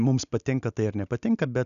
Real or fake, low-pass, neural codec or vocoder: real; 7.2 kHz; none